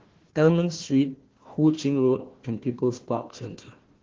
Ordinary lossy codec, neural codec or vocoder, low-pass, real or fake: Opus, 16 kbps; codec, 16 kHz, 1 kbps, FunCodec, trained on Chinese and English, 50 frames a second; 7.2 kHz; fake